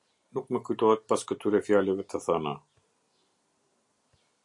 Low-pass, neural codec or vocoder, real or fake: 10.8 kHz; none; real